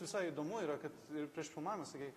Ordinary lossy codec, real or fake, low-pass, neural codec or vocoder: AAC, 48 kbps; real; 14.4 kHz; none